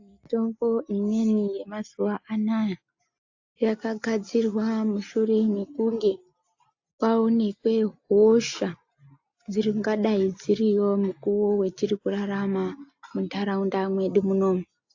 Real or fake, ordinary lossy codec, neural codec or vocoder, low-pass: real; AAC, 48 kbps; none; 7.2 kHz